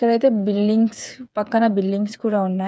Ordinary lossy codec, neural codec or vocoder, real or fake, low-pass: none; codec, 16 kHz, 8 kbps, FreqCodec, smaller model; fake; none